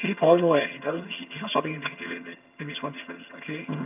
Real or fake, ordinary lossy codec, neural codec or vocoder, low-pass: fake; AAC, 32 kbps; vocoder, 22.05 kHz, 80 mel bands, HiFi-GAN; 3.6 kHz